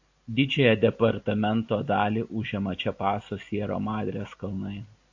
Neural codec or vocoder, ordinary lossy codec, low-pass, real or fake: none; AAC, 48 kbps; 7.2 kHz; real